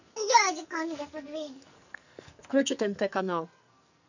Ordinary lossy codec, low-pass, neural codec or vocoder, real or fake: none; 7.2 kHz; codec, 44.1 kHz, 2.6 kbps, SNAC; fake